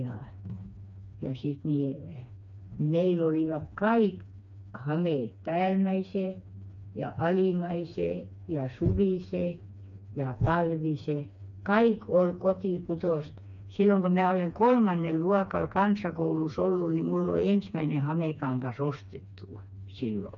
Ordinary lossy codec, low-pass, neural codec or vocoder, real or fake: none; 7.2 kHz; codec, 16 kHz, 2 kbps, FreqCodec, smaller model; fake